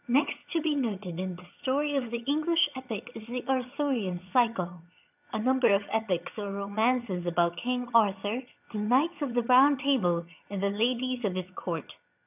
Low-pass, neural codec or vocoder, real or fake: 3.6 kHz; vocoder, 22.05 kHz, 80 mel bands, HiFi-GAN; fake